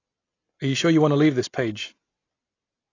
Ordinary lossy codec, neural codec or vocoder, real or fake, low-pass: AAC, 32 kbps; none; real; 7.2 kHz